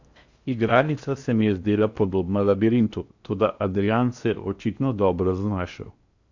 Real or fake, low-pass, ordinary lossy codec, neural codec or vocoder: fake; 7.2 kHz; Opus, 64 kbps; codec, 16 kHz in and 24 kHz out, 0.8 kbps, FocalCodec, streaming, 65536 codes